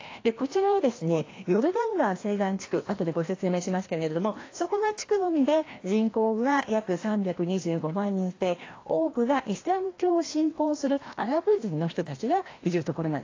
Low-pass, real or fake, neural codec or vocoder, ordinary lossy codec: 7.2 kHz; fake; codec, 16 kHz, 1 kbps, FreqCodec, larger model; AAC, 32 kbps